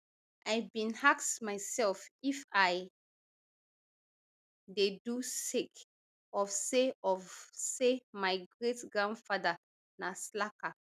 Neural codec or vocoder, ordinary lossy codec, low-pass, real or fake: none; none; 14.4 kHz; real